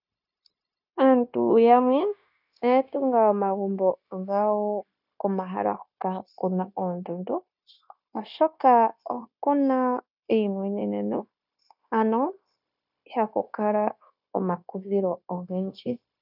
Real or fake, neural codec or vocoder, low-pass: fake; codec, 16 kHz, 0.9 kbps, LongCat-Audio-Codec; 5.4 kHz